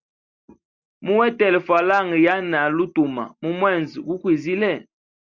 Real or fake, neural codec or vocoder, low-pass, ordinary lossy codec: real; none; 7.2 kHz; Opus, 64 kbps